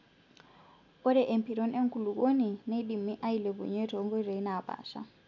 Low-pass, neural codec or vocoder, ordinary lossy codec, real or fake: 7.2 kHz; none; none; real